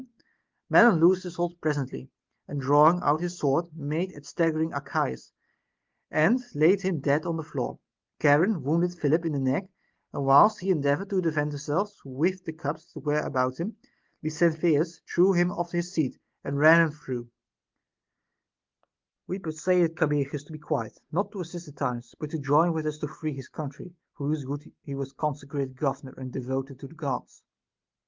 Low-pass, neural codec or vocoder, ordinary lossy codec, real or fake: 7.2 kHz; none; Opus, 32 kbps; real